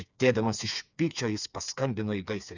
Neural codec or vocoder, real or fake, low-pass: codec, 16 kHz, 4 kbps, FreqCodec, smaller model; fake; 7.2 kHz